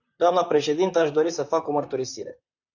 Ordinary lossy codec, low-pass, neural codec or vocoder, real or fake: AAC, 48 kbps; 7.2 kHz; vocoder, 44.1 kHz, 128 mel bands, Pupu-Vocoder; fake